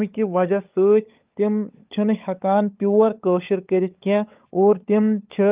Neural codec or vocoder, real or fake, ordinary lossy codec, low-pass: codec, 16 kHz, 16 kbps, FunCodec, trained on LibriTTS, 50 frames a second; fake; Opus, 32 kbps; 3.6 kHz